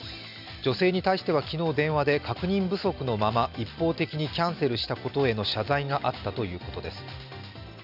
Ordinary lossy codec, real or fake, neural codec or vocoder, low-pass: none; real; none; 5.4 kHz